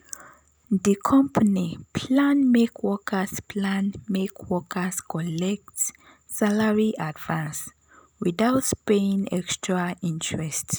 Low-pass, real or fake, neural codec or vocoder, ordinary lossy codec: none; real; none; none